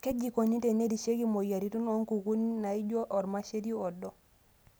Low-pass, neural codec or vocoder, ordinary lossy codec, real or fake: none; none; none; real